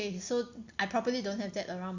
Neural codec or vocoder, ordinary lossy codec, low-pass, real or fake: none; Opus, 64 kbps; 7.2 kHz; real